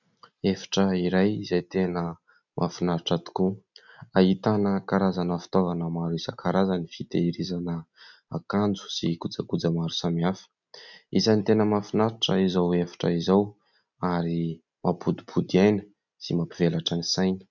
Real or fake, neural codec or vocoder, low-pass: real; none; 7.2 kHz